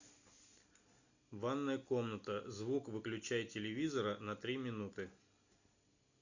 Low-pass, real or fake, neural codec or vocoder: 7.2 kHz; real; none